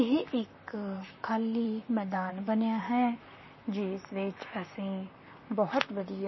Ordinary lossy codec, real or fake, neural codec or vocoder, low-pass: MP3, 24 kbps; fake; vocoder, 44.1 kHz, 128 mel bands, Pupu-Vocoder; 7.2 kHz